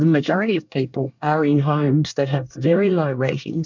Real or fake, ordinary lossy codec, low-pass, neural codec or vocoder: fake; MP3, 64 kbps; 7.2 kHz; codec, 24 kHz, 1 kbps, SNAC